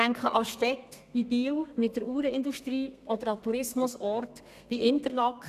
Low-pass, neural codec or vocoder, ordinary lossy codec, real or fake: 14.4 kHz; codec, 32 kHz, 1.9 kbps, SNAC; none; fake